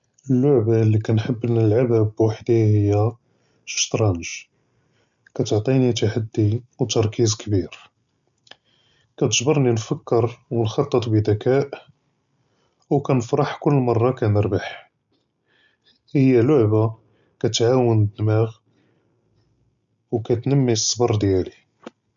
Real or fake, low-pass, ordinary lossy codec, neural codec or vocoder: real; 7.2 kHz; none; none